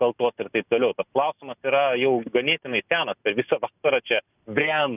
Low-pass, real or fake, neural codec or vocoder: 3.6 kHz; real; none